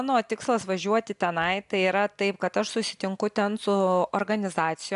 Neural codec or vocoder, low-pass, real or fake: none; 10.8 kHz; real